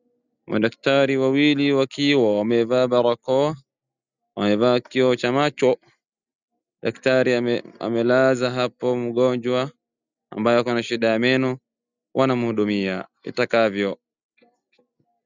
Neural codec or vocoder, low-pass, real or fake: none; 7.2 kHz; real